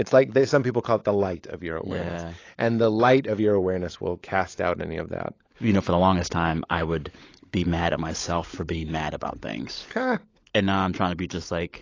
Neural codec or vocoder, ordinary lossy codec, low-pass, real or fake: codec, 16 kHz, 16 kbps, FunCodec, trained on LibriTTS, 50 frames a second; AAC, 32 kbps; 7.2 kHz; fake